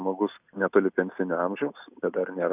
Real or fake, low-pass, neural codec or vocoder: real; 3.6 kHz; none